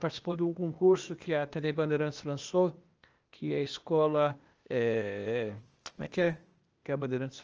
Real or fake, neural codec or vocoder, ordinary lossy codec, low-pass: fake; codec, 16 kHz, 0.8 kbps, ZipCodec; Opus, 24 kbps; 7.2 kHz